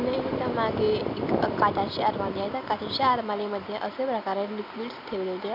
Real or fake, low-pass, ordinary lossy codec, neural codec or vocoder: real; 5.4 kHz; none; none